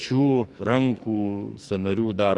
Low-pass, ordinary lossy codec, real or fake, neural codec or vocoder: 10.8 kHz; AAC, 64 kbps; fake; codec, 44.1 kHz, 2.6 kbps, SNAC